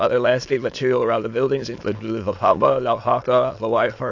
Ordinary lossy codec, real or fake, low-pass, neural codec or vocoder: AAC, 48 kbps; fake; 7.2 kHz; autoencoder, 22.05 kHz, a latent of 192 numbers a frame, VITS, trained on many speakers